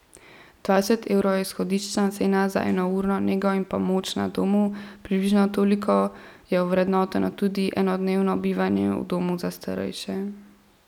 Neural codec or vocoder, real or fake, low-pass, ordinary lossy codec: none; real; 19.8 kHz; none